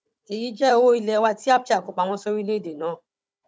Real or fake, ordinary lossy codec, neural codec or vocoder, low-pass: fake; none; codec, 16 kHz, 16 kbps, FunCodec, trained on Chinese and English, 50 frames a second; none